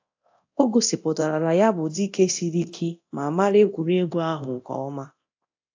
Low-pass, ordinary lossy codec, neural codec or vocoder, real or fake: 7.2 kHz; AAC, 48 kbps; codec, 24 kHz, 0.9 kbps, DualCodec; fake